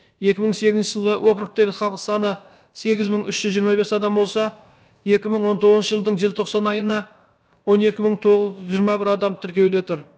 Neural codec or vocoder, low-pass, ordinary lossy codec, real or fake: codec, 16 kHz, about 1 kbps, DyCAST, with the encoder's durations; none; none; fake